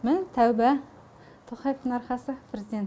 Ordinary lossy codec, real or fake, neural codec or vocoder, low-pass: none; real; none; none